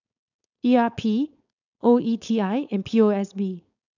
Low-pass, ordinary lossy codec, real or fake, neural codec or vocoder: 7.2 kHz; none; fake; codec, 16 kHz, 4.8 kbps, FACodec